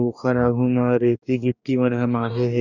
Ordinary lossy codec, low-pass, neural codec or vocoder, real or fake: none; 7.2 kHz; codec, 44.1 kHz, 2.6 kbps, DAC; fake